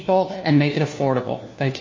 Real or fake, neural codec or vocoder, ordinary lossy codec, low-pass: fake; codec, 16 kHz, 1 kbps, FunCodec, trained on LibriTTS, 50 frames a second; MP3, 32 kbps; 7.2 kHz